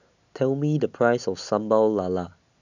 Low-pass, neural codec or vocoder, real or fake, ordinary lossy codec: 7.2 kHz; none; real; none